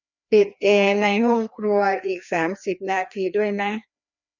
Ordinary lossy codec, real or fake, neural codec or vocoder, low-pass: none; fake; codec, 16 kHz, 2 kbps, FreqCodec, larger model; 7.2 kHz